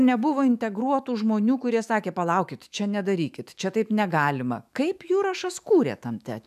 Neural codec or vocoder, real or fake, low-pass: autoencoder, 48 kHz, 128 numbers a frame, DAC-VAE, trained on Japanese speech; fake; 14.4 kHz